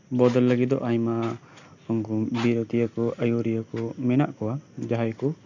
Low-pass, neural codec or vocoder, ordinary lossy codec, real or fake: 7.2 kHz; none; none; real